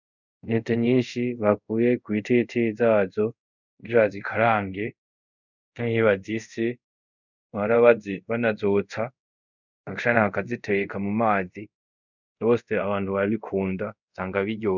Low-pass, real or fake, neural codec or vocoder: 7.2 kHz; fake; codec, 24 kHz, 0.5 kbps, DualCodec